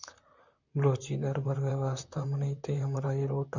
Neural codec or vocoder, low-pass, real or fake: vocoder, 44.1 kHz, 128 mel bands, Pupu-Vocoder; 7.2 kHz; fake